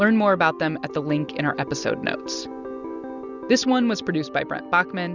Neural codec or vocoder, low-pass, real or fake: none; 7.2 kHz; real